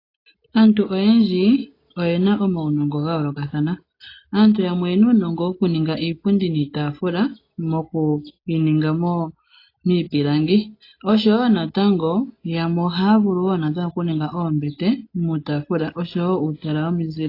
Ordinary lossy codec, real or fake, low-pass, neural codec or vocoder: AAC, 32 kbps; real; 5.4 kHz; none